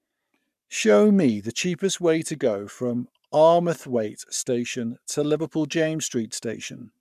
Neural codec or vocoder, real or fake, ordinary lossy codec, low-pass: codec, 44.1 kHz, 7.8 kbps, Pupu-Codec; fake; none; 14.4 kHz